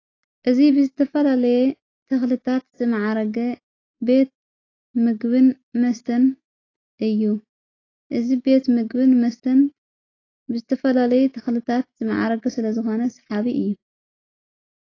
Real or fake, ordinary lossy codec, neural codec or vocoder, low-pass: real; AAC, 32 kbps; none; 7.2 kHz